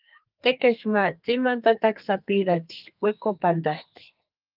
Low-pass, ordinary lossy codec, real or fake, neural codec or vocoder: 5.4 kHz; Opus, 24 kbps; fake; codec, 32 kHz, 1.9 kbps, SNAC